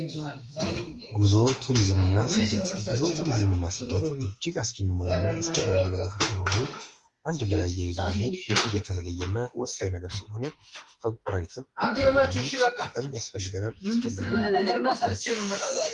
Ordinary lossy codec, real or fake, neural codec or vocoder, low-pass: Opus, 32 kbps; fake; autoencoder, 48 kHz, 32 numbers a frame, DAC-VAE, trained on Japanese speech; 10.8 kHz